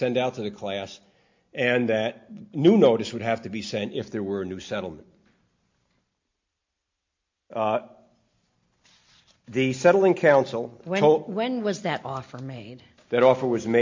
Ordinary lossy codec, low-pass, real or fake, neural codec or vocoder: MP3, 48 kbps; 7.2 kHz; real; none